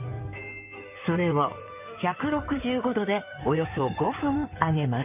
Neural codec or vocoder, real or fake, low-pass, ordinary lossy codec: codec, 16 kHz in and 24 kHz out, 2.2 kbps, FireRedTTS-2 codec; fake; 3.6 kHz; none